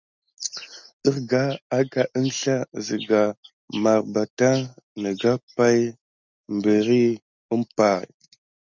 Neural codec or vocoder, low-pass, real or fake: none; 7.2 kHz; real